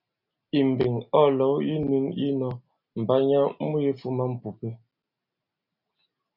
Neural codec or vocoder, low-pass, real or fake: none; 5.4 kHz; real